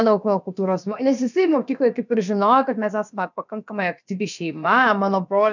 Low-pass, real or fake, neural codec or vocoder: 7.2 kHz; fake; codec, 16 kHz, about 1 kbps, DyCAST, with the encoder's durations